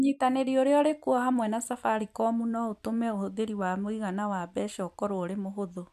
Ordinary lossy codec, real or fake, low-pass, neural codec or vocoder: none; fake; 14.4 kHz; vocoder, 44.1 kHz, 128 mel bands every 256 samples, BigVGAN v2